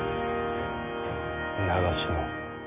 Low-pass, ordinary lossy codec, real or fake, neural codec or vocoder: 3.6 kHz; MP3, 32 kbps; real; none